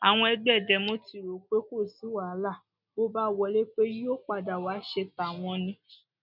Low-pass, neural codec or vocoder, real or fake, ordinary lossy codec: 5.4 kHz; none; real; none